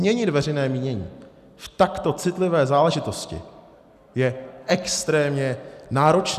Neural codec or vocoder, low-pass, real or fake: none; 14.4 kHz; real